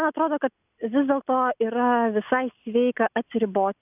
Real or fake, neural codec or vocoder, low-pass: real; none; 3.6 kHz